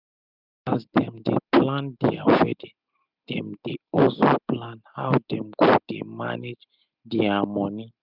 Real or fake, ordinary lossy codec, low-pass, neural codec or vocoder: real; none; 5.4 kHz; none